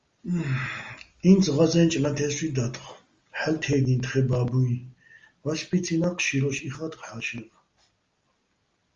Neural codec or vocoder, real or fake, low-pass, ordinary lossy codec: none; real; 7.2 kHz; Opus, 32 kbps